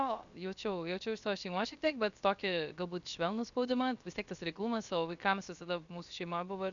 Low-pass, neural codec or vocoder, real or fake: 7.2 kHz; codec, 16 kHz, 0.7 kbps, FocalCodec; fake